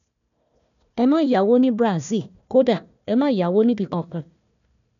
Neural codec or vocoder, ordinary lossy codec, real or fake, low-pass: codec, 16 kHz, 1 kbps, FunCodec, trained on Chinese and English, 50 frames a second; none; fake; 7.2 kHz